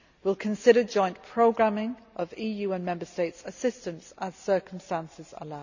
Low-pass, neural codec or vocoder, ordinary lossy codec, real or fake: 7.2 kHz; none; none; real